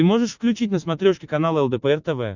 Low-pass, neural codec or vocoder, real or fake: 7.2 kHz; none; real